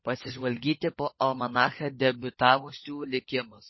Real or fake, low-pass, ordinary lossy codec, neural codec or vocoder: fake; 7.2 kHz; MP3, 24 kbps; codec, 16 kHz, 16 kbps, FunCodec, trained on LibriTTS, 50 frames a second